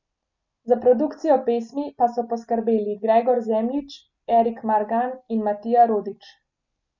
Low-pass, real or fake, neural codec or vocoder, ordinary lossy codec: 7.2 kHz; real; none; none